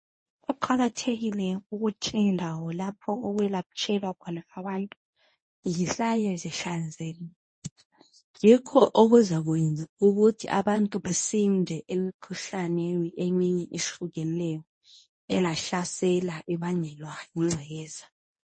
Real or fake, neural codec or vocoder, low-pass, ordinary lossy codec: fake; codec, 24 kHz, 0.9 kbps, WavTokenizer, medium speech release version 1; 9.9 kHz; MP3, 32 kbps